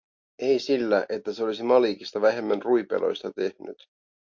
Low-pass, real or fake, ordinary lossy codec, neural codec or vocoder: 7.2 kHz; real; MP3, 48 kbps; none